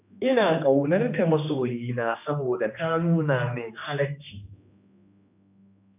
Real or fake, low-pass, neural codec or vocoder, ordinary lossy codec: fake; 3.6 kHz; codec, 16 kHz, 2 kbps, X-Codec, HuBERT features, trained on general audio; none